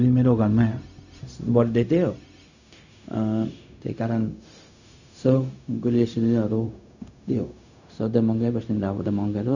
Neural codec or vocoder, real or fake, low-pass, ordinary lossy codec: codec, 16 kHz, 0.4 kbps, LongCat-Audio-Codec; fake; 7.2 kHz; none